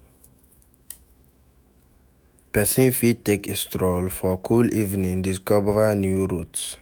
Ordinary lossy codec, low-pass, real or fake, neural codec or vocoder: none; none; fake; autoencoder, 48 kHz, 128 numbers a frame, DAC-VAE, trained on Japanese speech